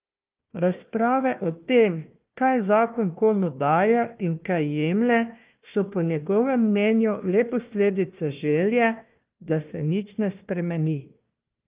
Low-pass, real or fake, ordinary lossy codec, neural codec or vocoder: 3.6 kHz; fake; Opus, 24 kbps; codec, 16 kHz, 1 kbps, FunCodec, trained on Chinese and English, 50 frames a second